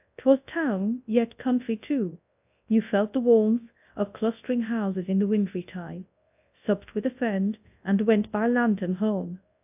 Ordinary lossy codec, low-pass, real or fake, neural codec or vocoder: AAC, 32 kbps; 3.6 kHz; fake; codec, 24 kHz, 0.9 kbps, WavTokenizer, large speech release